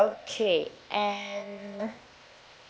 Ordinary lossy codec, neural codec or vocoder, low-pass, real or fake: none; codec, 16 kHz, 0.8 kbps, ZipCodec; none; fake